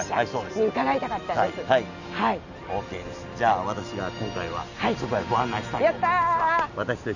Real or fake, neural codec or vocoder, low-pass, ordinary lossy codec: real; none; 7.2 kHz; none